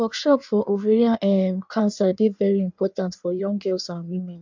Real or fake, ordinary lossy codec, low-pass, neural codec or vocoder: fake; MP3, 64 kbps; 7.2 kHz; codec, 16 kHz in and 24 kHz out, 1.1 kbps, FireRedTTS-2 codec